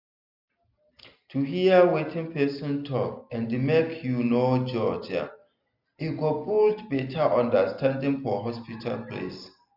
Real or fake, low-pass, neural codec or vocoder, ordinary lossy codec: real; 5.4 kHz; none; none